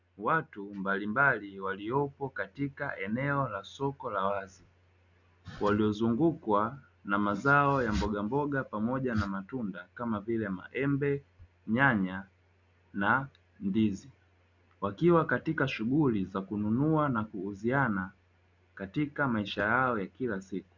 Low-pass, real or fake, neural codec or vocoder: 7.2 kHz; real; none